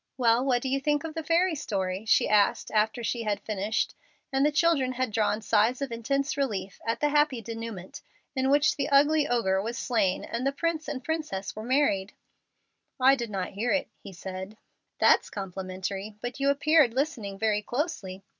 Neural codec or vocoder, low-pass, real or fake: none; 7.2 kHz; real